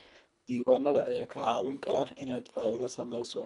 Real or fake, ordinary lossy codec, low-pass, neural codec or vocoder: fake; none; 10.8 kHz; codec, 24 kHz, 1.5 kbps, HILCodec